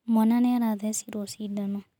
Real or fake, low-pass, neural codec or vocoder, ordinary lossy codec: real; 19.8 kHz; none; none